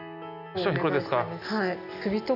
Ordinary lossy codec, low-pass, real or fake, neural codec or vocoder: none; 5.4 kHz; real; none